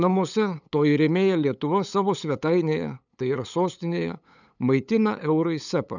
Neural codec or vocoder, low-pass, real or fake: codec, 16 kHz, 8 kbps, FunCodec, trained on LibriTTS, 25 frames a second; 7.2 kHz; fake